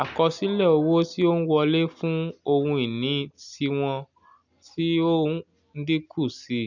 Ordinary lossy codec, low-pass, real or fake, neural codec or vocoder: none; 7.2 kHz; real; none